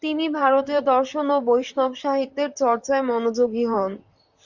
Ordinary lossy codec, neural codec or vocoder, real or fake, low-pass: Opus, 64 kbps; vocoder, 44.1 kHz, 128 mel bands, Pupu-Vocoder; fake; 7.2 kHz